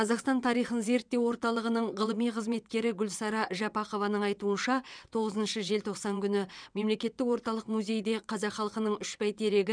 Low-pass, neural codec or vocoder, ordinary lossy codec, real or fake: 9.9 kHz; vocoder, 22.05 kHz, 80 mel bands, WaveNeXt; none; fake